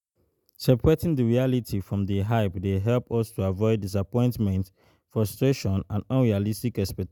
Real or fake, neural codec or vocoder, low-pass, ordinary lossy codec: real; none; 19.8 kHz; none